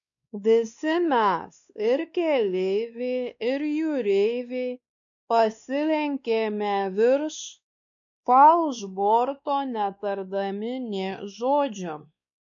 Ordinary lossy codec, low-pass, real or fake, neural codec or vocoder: MP3, 64 kbps; 7.2 kHz; fake; codec, 16 kHz, 2 kbps, X-Codec, WavLM features, trained on Multilingual LibriSpeech